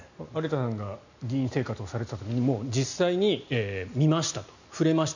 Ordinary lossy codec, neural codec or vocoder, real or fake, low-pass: none; none; real; 7.2 kHz